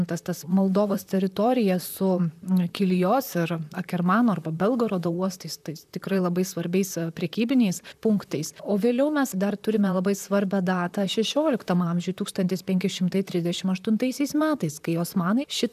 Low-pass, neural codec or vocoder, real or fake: 14.4 kHz; vocoder, 44.1 kHz, 128 mel bands, Pupu-Vocoder; fake